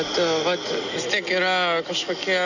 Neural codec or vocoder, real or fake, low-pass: none; real; 7.2 kHz